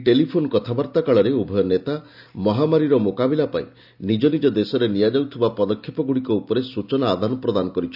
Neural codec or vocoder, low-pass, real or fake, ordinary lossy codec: none; 5.4 kHz; real; none